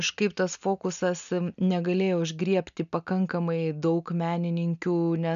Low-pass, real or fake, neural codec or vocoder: 7.2 kHz; real; none